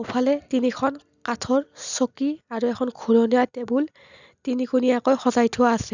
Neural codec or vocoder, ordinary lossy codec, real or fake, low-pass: none; none; real; 7.2 kHz